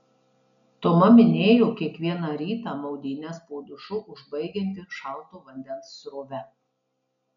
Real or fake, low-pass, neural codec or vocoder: real; 7.2 kHz; none